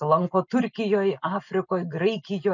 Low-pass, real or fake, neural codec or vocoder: 7.2 kHz; real; none